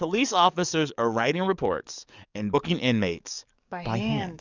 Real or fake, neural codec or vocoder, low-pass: fake; codec, 44.1 kHz, 7.8 kbps, DAC; 7.2 kHz